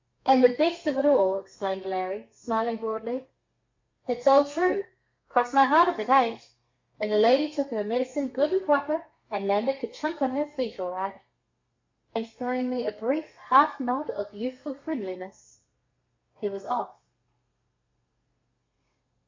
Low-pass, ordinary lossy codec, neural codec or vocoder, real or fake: 7.2 kHz; MP3, 64 kbps; codec, 32 kHz, 1.9 kbps, SNAC; fake